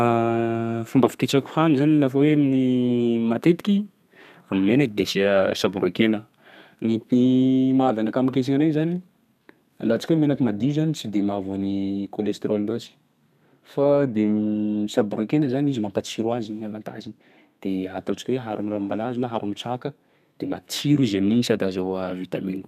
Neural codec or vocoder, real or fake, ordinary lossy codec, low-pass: codec, 32 kHz, 1.9 kbps, SNAC; fake; none; 14.4 kHz